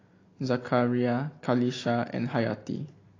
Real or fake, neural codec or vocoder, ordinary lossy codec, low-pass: real; none; AAC, 32 kbps; 7.2 kHz